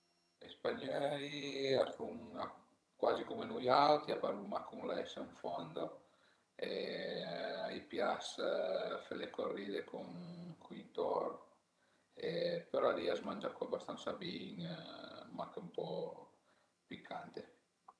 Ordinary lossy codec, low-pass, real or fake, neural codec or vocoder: none; none; fake; vocoder, 22.05 kHz, 80 mel bands, HiFi-GAN